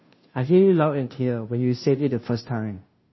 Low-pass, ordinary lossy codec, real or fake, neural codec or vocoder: 7.2 kHz; MP3, 24 kbps; fake; codec, 16 kHz, 0.5 kbps, FunCodec, trained on Chinese and English, 25 frames a second